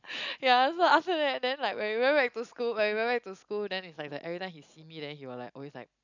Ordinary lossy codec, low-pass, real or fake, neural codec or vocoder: none; 7.2 kHz; real; none